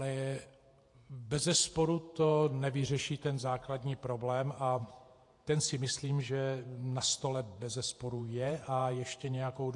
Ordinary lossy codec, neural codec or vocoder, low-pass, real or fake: AAC, 48 kbps; vocoder, 48 kHz, 128 mel bands, Vocos; 10.8 kHz; fake